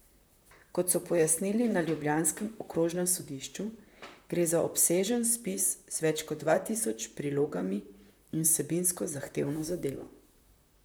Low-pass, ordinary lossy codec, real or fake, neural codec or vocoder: none; none; fake; vocoder, 44.1 kHz, 128 mel bands, Pupu-Vocoder